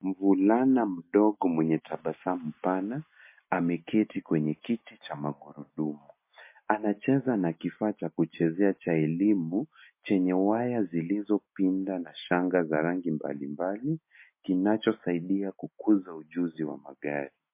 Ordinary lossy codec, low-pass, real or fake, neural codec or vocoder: MP3, 24 kbps; 3.6 kHz; real; none